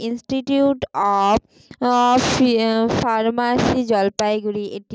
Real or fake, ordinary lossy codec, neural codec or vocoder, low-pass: real; none; none; none